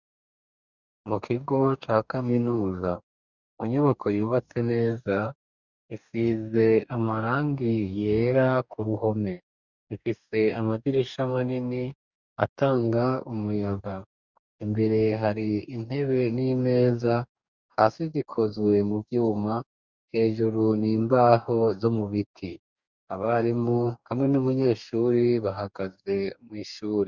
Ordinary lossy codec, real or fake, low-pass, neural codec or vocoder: Opus, 64 kbps; fake; 7.2 kHz; codec, 44.1 kHz, 2.6 kbps, DAC